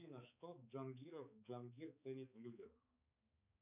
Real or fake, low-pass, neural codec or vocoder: fake; 3.6 kHz; codec, 16 kHz, 4 kbps, X-Codec, HuBERT features, trained on balanced general audio